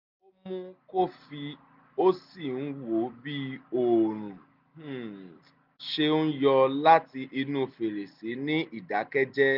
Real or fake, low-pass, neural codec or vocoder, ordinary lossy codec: real; 5.4 kHz; none; none